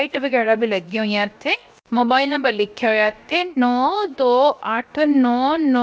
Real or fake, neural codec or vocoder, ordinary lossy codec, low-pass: fake; codec, 16 kHz, 0.7 kbps, FocalCodec; none; none